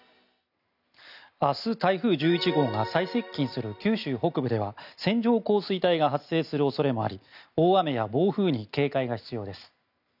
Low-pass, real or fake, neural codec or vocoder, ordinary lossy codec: 5.4 kHz; real; none; none